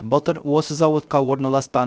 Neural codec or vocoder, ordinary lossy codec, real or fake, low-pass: codec, 16 kHz, 0.3 kbps, FocalCodec; none; fake; none